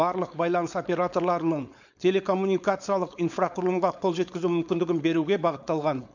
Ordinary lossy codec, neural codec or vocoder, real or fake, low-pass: none; codec, 16 kHz, 4.8 kbps, FACodec; fake; 7.2 kHz